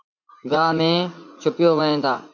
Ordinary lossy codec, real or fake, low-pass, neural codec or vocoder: MP3, 64 kbps; fake; 7.2 kHz; vocoder, 44.1 kHz, 80 mel bands, Vocos